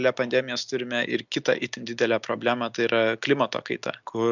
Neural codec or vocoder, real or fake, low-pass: none; real; 7.2 kHz